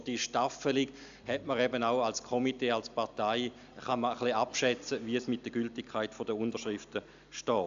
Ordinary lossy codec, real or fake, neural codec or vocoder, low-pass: AAC, 96 kbps; real; none; 7.2 kHz